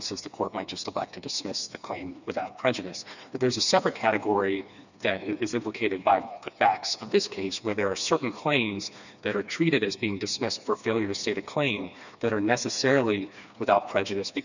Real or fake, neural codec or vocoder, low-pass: fake; codec, 16 kHz, 2 kbps, FreqCodec, smaller model; 7.2 kHz